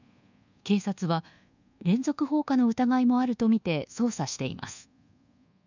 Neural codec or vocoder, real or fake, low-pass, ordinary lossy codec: codec, 24 kHz, 1.2 kbps, DualCodec; fake; 7.2 kHz; none